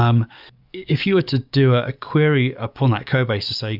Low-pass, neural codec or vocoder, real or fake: 5.4 kHz; codec, 44.1 kHz, 7.8 kbps, DAC; fake